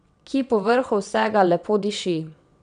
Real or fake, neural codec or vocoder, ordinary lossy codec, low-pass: fake; vocoder, 22.05 kHz, 80 mel bands, WaveNeXt; none; 9.9 kHz